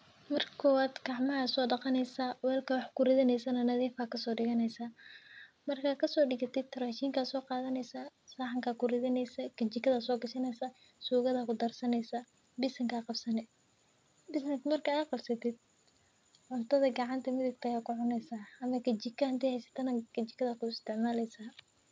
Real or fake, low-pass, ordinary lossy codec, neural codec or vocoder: real; none; none; none